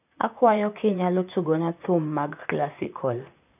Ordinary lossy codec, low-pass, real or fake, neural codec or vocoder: none; 3.6 kHz; fake; vocoder, 44.1 kHz, 128 mel bands, Pupu-Vocoder